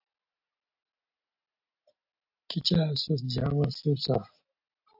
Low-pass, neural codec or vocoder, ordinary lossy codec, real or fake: 5.4 kHz; none; AAC, 48 kbps; real